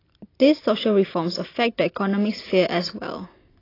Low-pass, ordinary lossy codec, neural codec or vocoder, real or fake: 5.4 kHz; AAC, 24 kbps; none; real